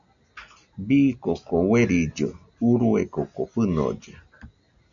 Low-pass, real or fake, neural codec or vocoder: 7.2 kHz; real; none